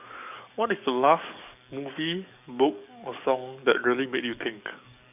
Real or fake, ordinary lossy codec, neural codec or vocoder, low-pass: fake; none; codec, 44.1 kHz, 7.8 kbps, DAC; 3.6 kHz